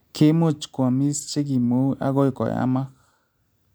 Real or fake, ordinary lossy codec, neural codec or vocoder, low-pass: real; none; none; none